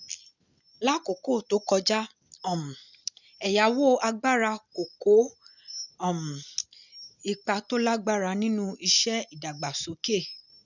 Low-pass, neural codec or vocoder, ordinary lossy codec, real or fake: 7.2 kHz; none; none; real